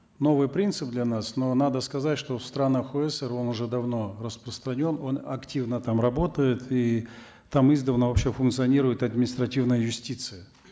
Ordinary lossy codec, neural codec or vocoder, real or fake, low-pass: none; none; real; none